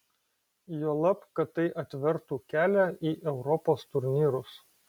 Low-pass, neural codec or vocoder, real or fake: 19.8 kHz; none; real